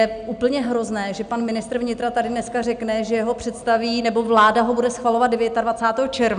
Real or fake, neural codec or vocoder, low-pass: real; none; 9.9 kHz